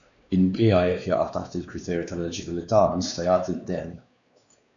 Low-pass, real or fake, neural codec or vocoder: 7.2 kHz; fake; codec, 16 kHz, 2 kbps, X-Codec, WavLM features, trained on Multilingual LibriSpeech